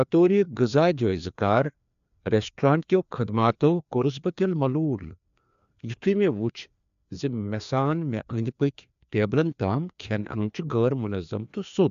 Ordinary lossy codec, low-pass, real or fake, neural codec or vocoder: AAC, 96 kbps; 7.2 kHz; fake; codec, 16 kHz, 2 kbps, FreqCodec, larger model